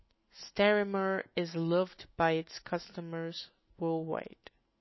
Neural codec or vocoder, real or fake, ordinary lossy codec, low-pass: autoencoder, 48 kHz, 128 numbers a frame, DAC-VAE, trained on Japanese speech; fake; MP3, 24 kbps; 7.2 kHz